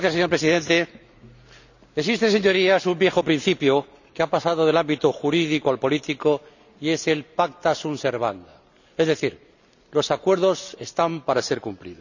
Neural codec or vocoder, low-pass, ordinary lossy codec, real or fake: none; 7.2 kHz; none; real